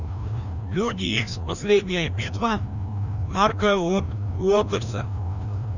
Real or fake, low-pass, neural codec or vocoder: fake; 7.2 kHz; codec, 16 kHz, 1 kbps, FreqCodec, larger model